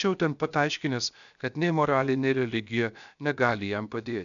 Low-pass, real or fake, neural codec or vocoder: 7.2 kHz; fake; codec, 16 kHz, 0.7 kbps, FocalCodec